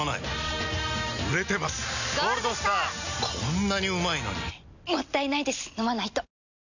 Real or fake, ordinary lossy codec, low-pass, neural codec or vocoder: real; none; 7.2 kHz; none